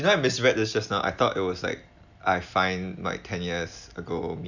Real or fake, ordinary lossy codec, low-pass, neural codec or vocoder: real; none; 7.2 kHz; none